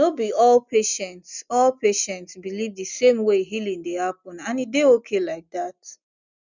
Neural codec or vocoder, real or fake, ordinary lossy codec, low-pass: none; real; none; 7.2 kHz